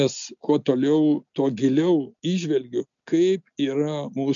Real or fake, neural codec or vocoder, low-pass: real; none; 7.2 kHz